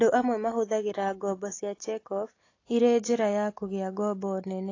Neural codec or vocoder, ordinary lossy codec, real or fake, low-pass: none; AAC, 32 kbps; real; 7.2 kHz